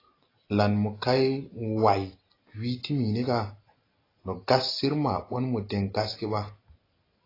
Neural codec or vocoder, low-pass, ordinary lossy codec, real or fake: none; 5.4 kHz; AAC, 24 kbps; real